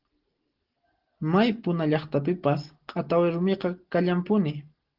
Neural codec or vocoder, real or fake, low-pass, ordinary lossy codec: none; real; 5.4 kHz; Opus, 16 kbps